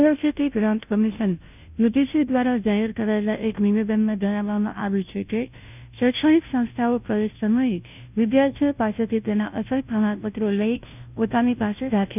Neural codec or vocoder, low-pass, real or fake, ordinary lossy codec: codec, 16 kHz, 0.5 kbps, FunCodec, trained on Chinese and English, 25 frames a second; 3.6 kHz; fake; none